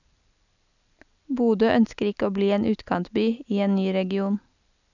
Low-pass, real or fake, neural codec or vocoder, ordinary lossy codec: 7.2 kHz; real; none; none